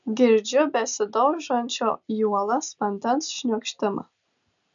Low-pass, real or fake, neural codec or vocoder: 7.2 kHz; real; none